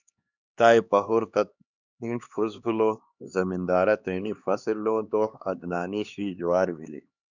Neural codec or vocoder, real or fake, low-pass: codec, 16 kHz, 2 kbps, X-Codec, HuBERT features, trained on LibriSpeech; fake; 7.2 kHz